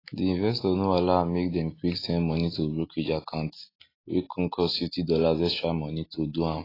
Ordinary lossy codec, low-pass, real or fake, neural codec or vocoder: AAC, 24 kbps; 5.4 kHz; real; none